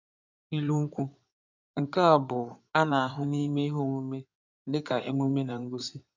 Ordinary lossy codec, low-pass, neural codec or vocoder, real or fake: none; 7.2 kHz; codec, 16 kHz in and 24 kHz out, 2.2 kbps, FireRedTTS-2 codec; fake